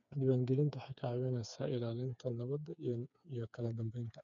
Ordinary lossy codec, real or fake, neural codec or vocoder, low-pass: none; fake; codec, 16 kHz, 4 kbps, FreqCodec, smaller model; 7.2 kHz